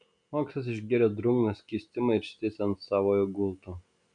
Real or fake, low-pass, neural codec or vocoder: real; 9.9 kHz; none